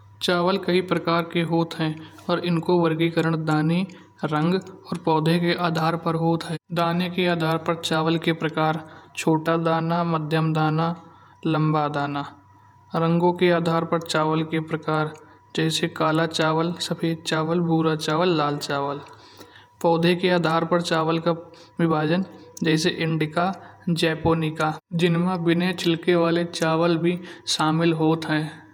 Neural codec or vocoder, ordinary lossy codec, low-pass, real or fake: vocoder, 48 kHz, 128 mel bands, Vocos; none; 19.8 kHz; fake